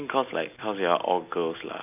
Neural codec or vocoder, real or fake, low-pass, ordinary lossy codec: none; real; 3.6 kHz; none